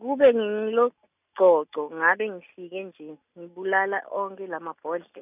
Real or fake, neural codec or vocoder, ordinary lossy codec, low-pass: real; none; none; 3.6 kHz